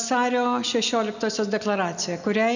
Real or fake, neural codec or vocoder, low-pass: real; none; 7.2 kHz